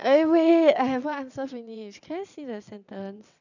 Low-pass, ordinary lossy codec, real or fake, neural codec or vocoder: 7.2 kHz; none; fake; vocoder, 22.05 kHz, 80 mel bands, WaveNeXt